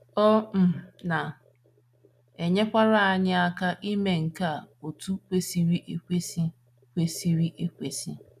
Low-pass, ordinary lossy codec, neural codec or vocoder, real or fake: 14.4 kHz; none; none; real